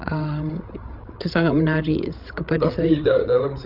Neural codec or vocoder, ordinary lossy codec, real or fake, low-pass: codec, 16 kHz, 16 kbps, FreqCodec, larger model; Opus, 24 kbps; fake; 5.4 kHz